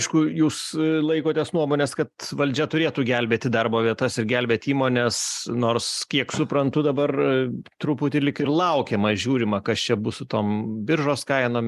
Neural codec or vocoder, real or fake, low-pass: none; real; 14.4 kHz